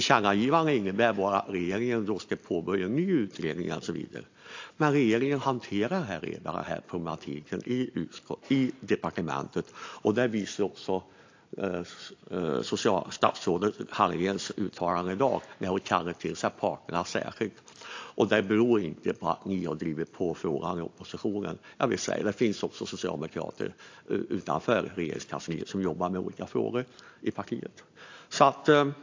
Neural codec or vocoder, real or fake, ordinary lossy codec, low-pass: none; real; AAC, 48 kbps; 7.2 kHz